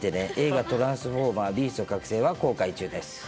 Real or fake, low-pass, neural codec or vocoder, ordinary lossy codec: real; none; none; none